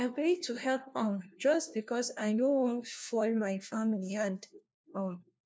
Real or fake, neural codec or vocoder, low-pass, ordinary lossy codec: fake; codec, 16 kHz, 1 kbps, FunCodec, trained on LibriTTS, 50 frames a second; none; none